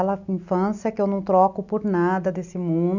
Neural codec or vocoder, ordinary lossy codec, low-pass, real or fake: none; none; 7.2 kHz; real